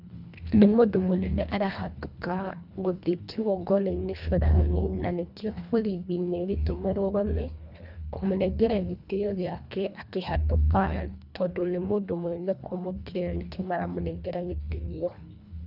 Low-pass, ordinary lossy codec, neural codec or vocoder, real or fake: 5.4 kHz; none; codec, 24 kHz, 1.5 kbps, HILCodec; fake